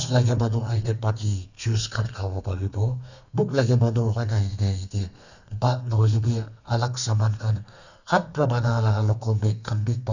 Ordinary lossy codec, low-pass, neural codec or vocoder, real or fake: none; 7.2 kHz; codec, 32 kHz, 1.9 kbps, SNAC; fake